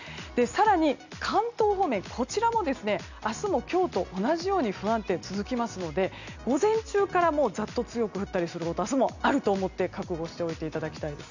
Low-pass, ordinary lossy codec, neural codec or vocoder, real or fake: 7.2 kHz; none; none; real